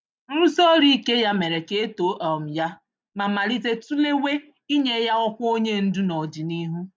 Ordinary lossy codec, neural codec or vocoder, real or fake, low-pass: none; none; real; none